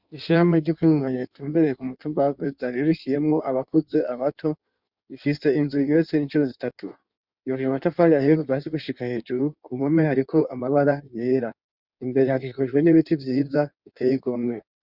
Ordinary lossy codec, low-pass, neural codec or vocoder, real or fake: Opus, 64 kbps; 5.4 kHz; codec, 16 kHz in and 24 kHz out, 1.1 kbps, FireRedTTS-2 codec; fake